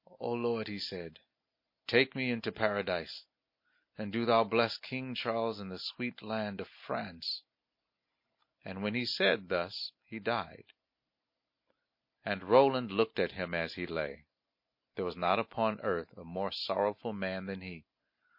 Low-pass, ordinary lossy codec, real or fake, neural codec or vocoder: 5.4 kHz; MP3, 32 kbps; real; none